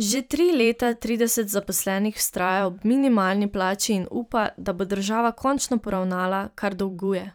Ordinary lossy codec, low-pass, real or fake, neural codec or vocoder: none; none; fake; vocoder, 44.1 kHz, 128 mel bands every 512 samples, BigVGAN v2